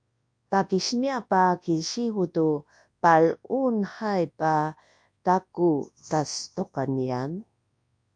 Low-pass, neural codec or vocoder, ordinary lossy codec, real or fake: 9.9 kHz; codec, 24 kHz, 0.9 kbps, WavTokenizer, large speech release; AAC, 64 kbps; fake